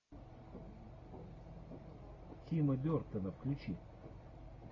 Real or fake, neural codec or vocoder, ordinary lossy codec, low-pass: real; none; AAC, 32 kbps; 7.2 kHz